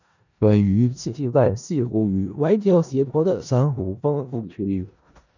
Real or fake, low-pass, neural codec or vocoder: fake; 7.2 kHz; codec, 16 kHz in and 24 kHz out, 0.4 kbps, LongCat-Audio-Codec, four codebook decoder